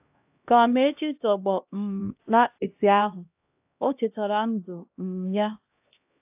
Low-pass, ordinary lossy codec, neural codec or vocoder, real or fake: 3.6 kHz; AAC, 32 kbps; codec, 16 kHz, 1 kbps, X-Codec, HuBERT features, trained on LibriSpeech; fake